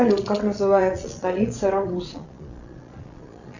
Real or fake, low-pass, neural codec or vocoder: fake; 7.2 kHz; vocoder, 22.05 kHz, 80 mel bands, Vocos